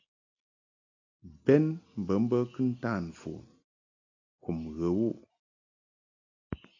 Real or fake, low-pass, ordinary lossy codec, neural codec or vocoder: real; 7.2 kHz; AAC, 48 kbps; none